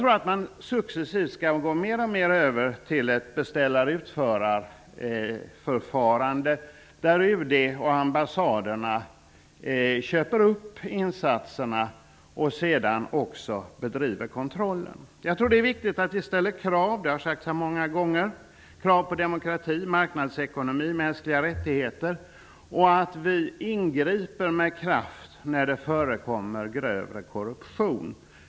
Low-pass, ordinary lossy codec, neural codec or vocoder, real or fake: none; none; none; real